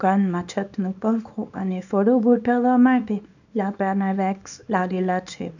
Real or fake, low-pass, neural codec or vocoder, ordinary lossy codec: fake; 7.2 kHz; codec, 24 kHz, 0.9 kbps, WavTokenizer, small release; none